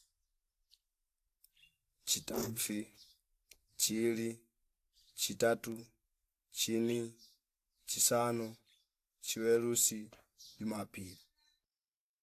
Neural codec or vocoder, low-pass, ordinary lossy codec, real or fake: vocoder, 44.1 kHz, 128 mel bands every 512 samples, BigVGAN v2; 14.4 kHz; AAC, 64 kbps; fake